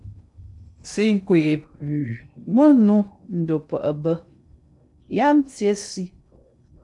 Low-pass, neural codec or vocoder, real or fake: 10.8 kHz; codec, 16 kHz in and 24 kHz out, 0.6 kbps, FocalCodec, streaming, 2048 codes; fake